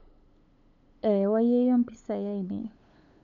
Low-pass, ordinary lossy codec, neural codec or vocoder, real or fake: 7.2 kHz; none; codec, 16 kHz, 8 kbps, FunCodec, trained on LibriTTS, 25 frames a second; fake